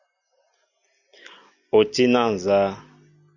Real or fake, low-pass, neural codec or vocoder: real; 7.2 kHz; none